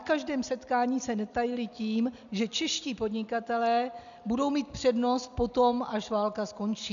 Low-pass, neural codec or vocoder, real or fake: 7.2 kHz; none; real